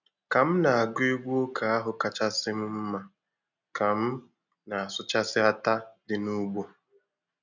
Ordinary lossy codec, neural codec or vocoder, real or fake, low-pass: none; none; real; 7.2 kHz